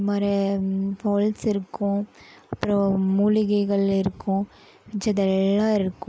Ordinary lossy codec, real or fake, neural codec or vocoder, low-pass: none; real; none; none